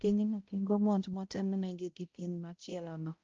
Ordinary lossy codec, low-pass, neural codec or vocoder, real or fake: Opus, 24 kbps; 7.2 kHz; codec, 16 kHz, 0.5 kbps, X-Codec, HuBERT features, trained on balanced general audio; fake